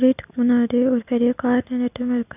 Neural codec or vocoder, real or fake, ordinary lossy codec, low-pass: none; real; none; 3.6 kHz